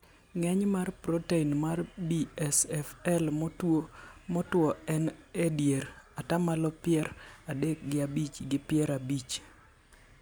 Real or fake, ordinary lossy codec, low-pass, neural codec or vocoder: real; none; none; none